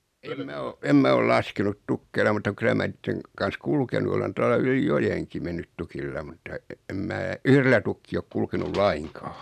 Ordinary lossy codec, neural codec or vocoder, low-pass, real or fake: none; none; 14.4 kHz; real